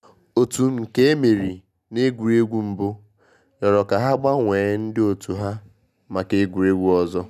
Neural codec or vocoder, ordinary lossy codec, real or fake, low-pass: none; none; real; 14.4 kHz